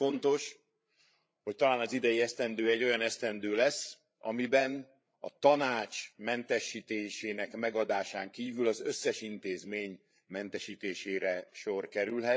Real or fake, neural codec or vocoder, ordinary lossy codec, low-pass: fake; codec, 16 kHz, 8 kbps, FreqCodec, larger model; none; none